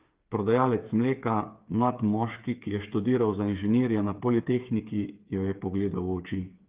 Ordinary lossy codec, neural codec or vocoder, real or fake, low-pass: Opus, 32 kbps; codec, 16 kHz, 8 kbps, FreqCodec, smaller model; fake; 3.6 kHz